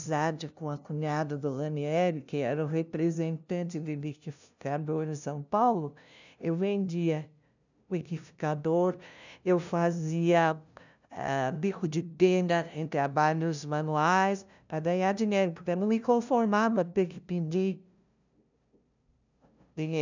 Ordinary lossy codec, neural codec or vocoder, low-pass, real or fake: none; codec, 16 kHz, 0.5 kbps, FunCodec, trained on LibriTTS, 25 frames a second; 7.2 kHz; fake